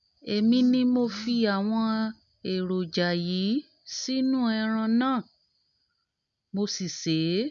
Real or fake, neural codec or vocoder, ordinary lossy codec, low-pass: real; none; none; 7.2 kHz